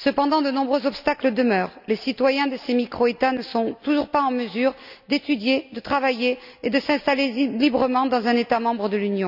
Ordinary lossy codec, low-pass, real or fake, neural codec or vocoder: none; 5.4 kHz; real; none